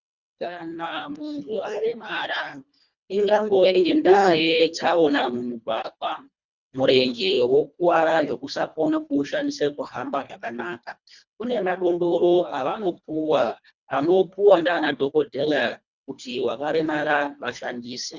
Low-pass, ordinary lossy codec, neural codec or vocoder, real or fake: 7.2 kHz; Opus, 64 kbps; codec, 24 kHz, 1.5 kbps, HILCodec; fake